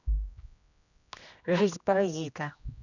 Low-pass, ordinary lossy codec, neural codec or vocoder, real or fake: 7.2 kHz; none; codec, 16 kHz, 1 kbps, X-Codec, HuBERT features, trained on general audio; fake